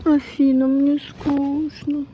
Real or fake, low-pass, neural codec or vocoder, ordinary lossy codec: fake; none; codec, 16 kHz, 16 kbps, FreqCodec, larger model; none